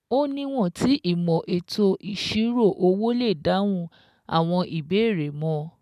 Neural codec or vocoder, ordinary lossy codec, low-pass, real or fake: none; none; 14.4 kHz; real